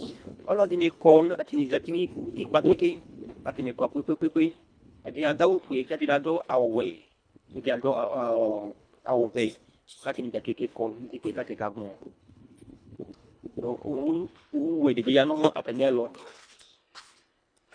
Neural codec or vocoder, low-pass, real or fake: codec, 24 kHz, 1.5 kbps, HILCodec; 9.9 kHz; fake